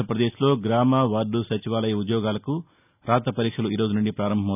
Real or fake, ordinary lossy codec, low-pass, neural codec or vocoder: real; none; 3.6 kHz; none